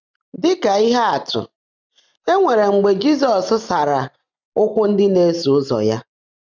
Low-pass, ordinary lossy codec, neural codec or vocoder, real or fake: 7.2 kHz; none; none; real